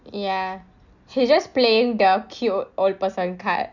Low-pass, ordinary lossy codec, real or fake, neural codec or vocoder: 7.2 kHz; none; real; none